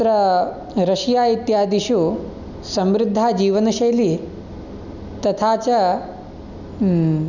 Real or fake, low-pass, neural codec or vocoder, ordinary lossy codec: real; 7.2 kHz; none; none